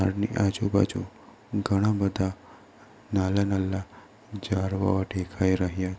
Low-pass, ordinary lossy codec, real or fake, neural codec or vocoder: none; none; real; none